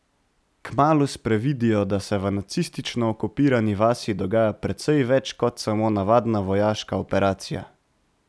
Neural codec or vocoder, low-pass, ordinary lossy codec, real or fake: none; none; none; real